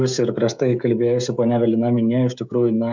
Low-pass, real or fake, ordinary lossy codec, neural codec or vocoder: 7.2 kHz; fake; MP3, 64 kbps; codec, 16 kHz, 16 kbps, FreqCodec, smaller model